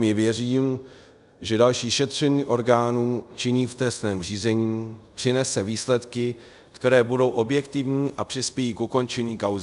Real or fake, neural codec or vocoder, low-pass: fake; codec, 24 kHz, 0.5 kbps, DualCodec; 10.8 kHz